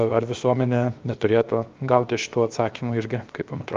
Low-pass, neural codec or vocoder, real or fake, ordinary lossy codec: 7.2 kHz; codec, 16 kHz, 0.7 kbps, FocalCodec; fake; Opus, 32 kbps